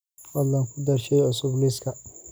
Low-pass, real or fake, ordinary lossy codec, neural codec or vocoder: none; real; none; none